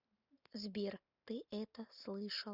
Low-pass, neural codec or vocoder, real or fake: 5.4 kHz; none; real